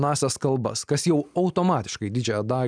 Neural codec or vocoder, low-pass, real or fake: none; 9.9 kHz; real